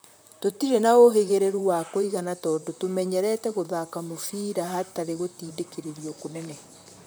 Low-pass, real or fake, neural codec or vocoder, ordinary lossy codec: none; fake; vocoder, 44.1 kHz, 128 mel bands, Pupu-Vocoder; none